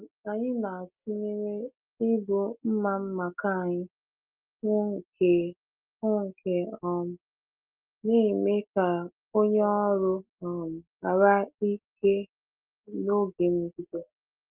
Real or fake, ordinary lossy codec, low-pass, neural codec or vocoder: real; Opus, 24 kbps; 3.6 kHz; none